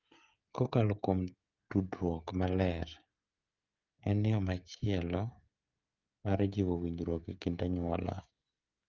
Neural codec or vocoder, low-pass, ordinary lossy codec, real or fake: codec, 16 kHz, 16 kbps, FreqCodec, smaller model; 7.2 kHz; Opus, 24 kbps; fake